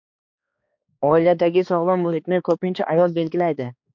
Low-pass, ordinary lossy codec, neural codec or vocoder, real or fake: 7.2 kHz; MP3, 48 kbps; codec, 16 kHz, 2 kbps, X-Codec, HuBERT features, trained on balanced general audio; fake